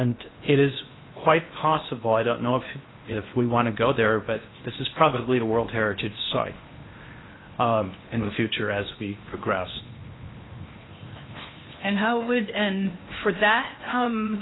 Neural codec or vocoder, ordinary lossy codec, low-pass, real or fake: codec, 16 kHz in and 24 kHz out, 0.8 kbps, FocalCodec, streaming, 65536 codes; AAC, 16 kbps; 7.2 kHz; fake